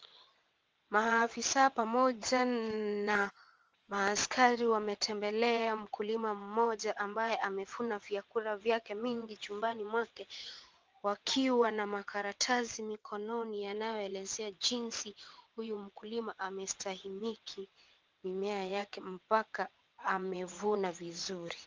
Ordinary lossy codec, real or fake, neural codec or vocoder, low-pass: Opus, 24 kbps; fake; vocoder, 22.05 kHz, 80 mel bands, WaveNeXt; 7.2 kHz